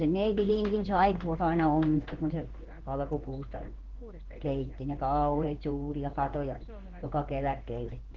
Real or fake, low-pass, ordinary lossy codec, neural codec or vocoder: fake; 7.2 kHz; Opus, 16 kbps; codec, 16 kHz in and 24 kHz out, 1 kbps, XY-Tokenizer